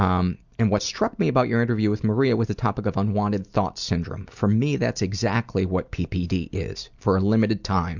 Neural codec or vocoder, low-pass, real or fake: none; 7.2 kHz; real